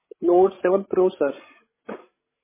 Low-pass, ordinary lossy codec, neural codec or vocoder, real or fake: 3.6 kHz; MP3, 16 kbps; codec, 16 kHz, 16 kbps, FreqCodec, larger model; fake